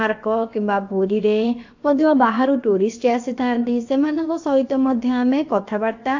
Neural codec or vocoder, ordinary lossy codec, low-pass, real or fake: codec, 16 kHz, about 1 kbps, DyCAST, with the encoder's durations; AAC, 48 kbps; 7.2 kHz; fake